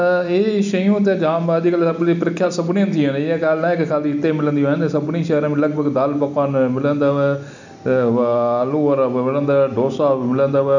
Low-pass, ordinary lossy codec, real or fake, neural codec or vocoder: 7.2 kHz; none; real; none